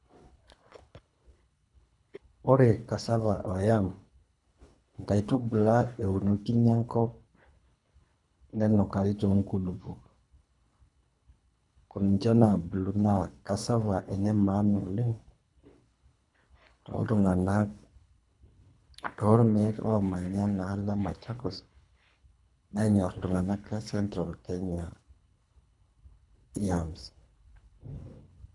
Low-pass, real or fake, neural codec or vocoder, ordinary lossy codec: 10.8 kHz; fake; codec, 24 kHz, 3 kbps, HILCodec; none